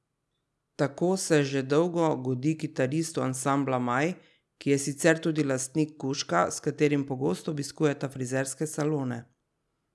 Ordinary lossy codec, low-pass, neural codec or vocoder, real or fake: none; none; none; real